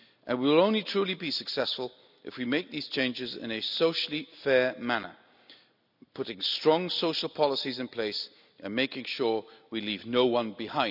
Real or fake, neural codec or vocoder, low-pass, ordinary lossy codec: real; none; 5.4 kHz; none